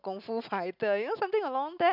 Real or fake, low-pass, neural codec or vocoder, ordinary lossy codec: real; 5.4 kHz; none; none